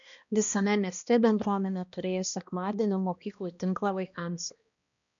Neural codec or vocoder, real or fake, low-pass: codec, 16 kHz, 1 kbps, X-Codec, HuBERT features, trained on balanced general audio; fake; 7.2 kHz